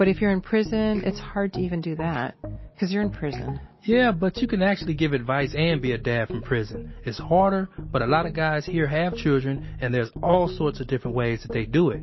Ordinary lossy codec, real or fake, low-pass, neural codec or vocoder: MP3, 24 kbps; real; 7.2 kHz; none